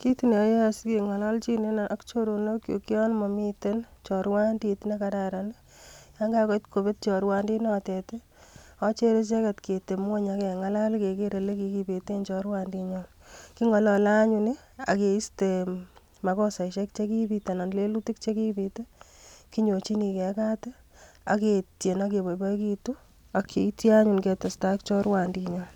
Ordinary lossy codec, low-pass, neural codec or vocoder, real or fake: none; 19.8 kHz; none; real